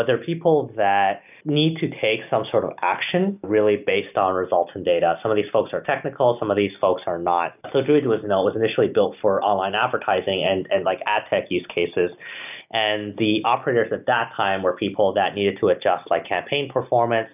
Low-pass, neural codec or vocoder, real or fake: 3.6 kHz; none; real